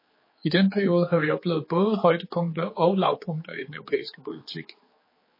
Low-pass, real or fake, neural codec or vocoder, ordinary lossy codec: 5.4 kHz; fake; codec, 16 kHz, 4 kbps, X-Codec, HuBERT features, trained on general audio; MP3, 24 kbps